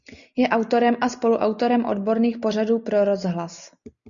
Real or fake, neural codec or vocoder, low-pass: real; none; 7.2 kHz